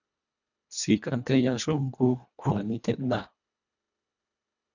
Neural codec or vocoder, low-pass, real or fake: codec, 24 kHz, 1.5 kbps, HILCodec; 7.2 kHz; fake